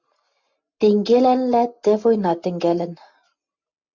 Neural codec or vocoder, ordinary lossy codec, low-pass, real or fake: none; MP3, 64 kbps; 7.2 kHz; real